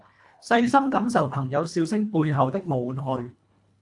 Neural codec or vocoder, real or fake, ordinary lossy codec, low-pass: codec, 24 kHz, 1.5 kbps, HILCodec; fake; AAC, 64 kbps; 10.8 kHz